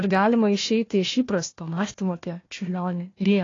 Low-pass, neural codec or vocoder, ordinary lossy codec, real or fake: 7.2 kHz; codec, 16 kHz, 1 kbps, FunCodec, trained on Chinese and English, 50 frames a second; AAC, 32 kbps; fake